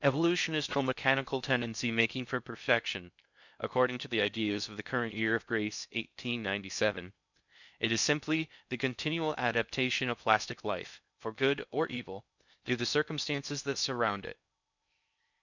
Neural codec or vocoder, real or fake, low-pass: codec, 16 kHz in and 24 kHz out, 0.6 kbps, FocalCodec, streaming, 4096 codes; fake; 7.2 kHz